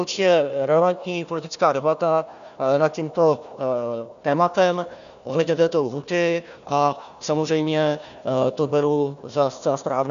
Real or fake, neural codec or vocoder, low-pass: fake; codec, 16 kHz, 1 kbps, FunCodec, trained on Chinese and English, 50 frames a second; 7.2 kHz